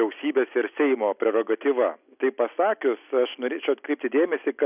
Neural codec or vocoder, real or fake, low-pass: none; real; 3.6 kHz